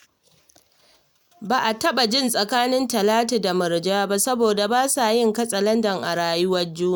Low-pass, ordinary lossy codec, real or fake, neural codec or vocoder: none; none; real; none